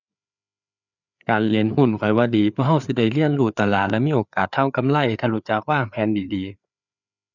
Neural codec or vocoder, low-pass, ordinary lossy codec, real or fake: codec, 16 kHz, 4 kbps, FreqCodec, larger model; 7.2 kHz; none; fake